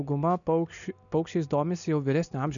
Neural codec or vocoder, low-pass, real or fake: none; 7.2 kHz; real